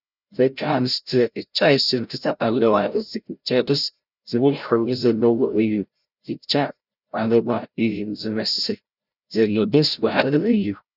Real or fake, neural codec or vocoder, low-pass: fake; codec, 16 kHz, 0.5 kbps, FreqCodec, larger model; 5.4 kHz